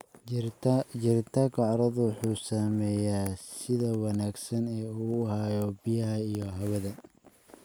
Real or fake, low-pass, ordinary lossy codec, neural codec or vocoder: real; none; none; none